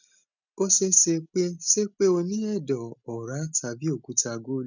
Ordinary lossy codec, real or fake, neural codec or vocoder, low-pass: none; real; none; 7.2 kHz